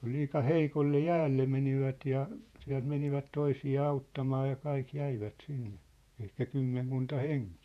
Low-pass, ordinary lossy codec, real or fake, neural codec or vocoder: 14.4 kHz; none; fake; vocoder, 48 kHz, 128 mel bands, Vocos